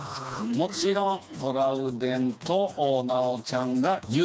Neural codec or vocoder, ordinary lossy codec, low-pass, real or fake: codec, 16 kHz, 2 kbps, FreqCodec, smaller model; none; none; fake